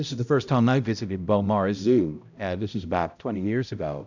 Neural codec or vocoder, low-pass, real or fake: codec, 16 kHz, 0.5 kbps, X-Codec, HuBERT features, trained on balanced general audio; 7.2 kHz; fake